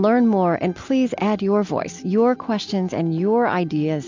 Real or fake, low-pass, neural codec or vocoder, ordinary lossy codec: real; 7.2 kHz; none; MP3, 64 kbps